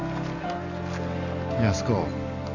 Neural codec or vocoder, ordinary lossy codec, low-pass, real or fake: none; none; 7.2 kHz; real